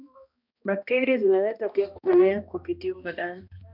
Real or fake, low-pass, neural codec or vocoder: fake; 5.4 kHz; codec, 16 kHz, 1 kbps, X-Codec, HuBERT features, trained on balanced general audio